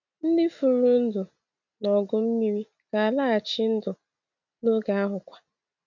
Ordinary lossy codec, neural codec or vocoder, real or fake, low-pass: none; none; real; 7.2 kHz